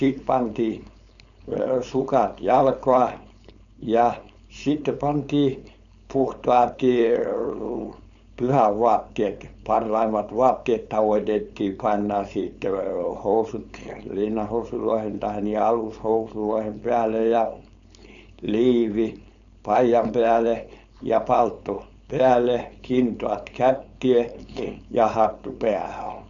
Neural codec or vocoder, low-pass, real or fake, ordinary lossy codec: codec, 16 kHz, 4.8 kbps, FACodec; 7.2 kHz; fake; none